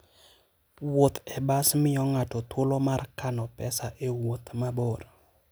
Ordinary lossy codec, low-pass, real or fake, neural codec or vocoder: none; none; real; none